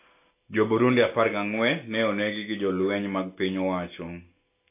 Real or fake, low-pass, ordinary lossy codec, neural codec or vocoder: real; 3.6 kHz; AAC, 24 kbps; none